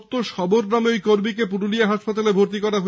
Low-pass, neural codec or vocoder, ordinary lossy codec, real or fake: none; none; none; real